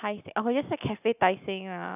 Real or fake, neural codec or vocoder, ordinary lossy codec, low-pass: real; none; none; 3.6 kHz